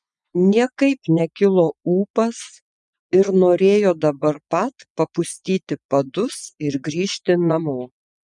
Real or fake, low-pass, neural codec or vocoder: fake; 10.8 kHz; vocoder, 24 kHz, 100 mel bands, Vocos